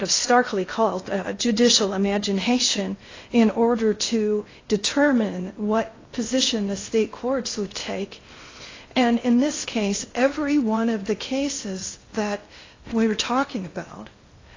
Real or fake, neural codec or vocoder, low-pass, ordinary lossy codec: fake; codec, 16 kHz in and 24 kHz out, 0.6 kbps, FocalCodec, streaming, 2048 codes; 7.2 kHz; AAC, 32 kbps